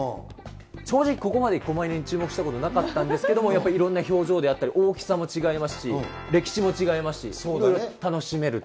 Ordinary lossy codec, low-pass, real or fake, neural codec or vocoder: none; none; real; none